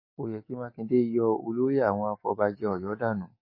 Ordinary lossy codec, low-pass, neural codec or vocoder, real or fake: MP3, 24 kbps; 5.4 kHz; codec, 44.1 kHz, 7.8 kbps, DAC; fake